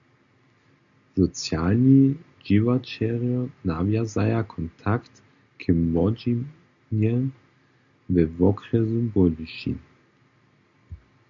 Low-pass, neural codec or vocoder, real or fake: 7.2 kHz; none; real